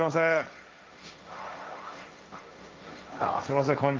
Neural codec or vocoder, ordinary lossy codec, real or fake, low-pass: codec, 16 kHz, 1.1 kbps, Voila-Tokenizer; Opus, 24 kbps; fake; 7.2 kHz